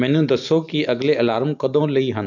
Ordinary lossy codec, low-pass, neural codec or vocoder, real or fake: none; 7.2 kHz; none; real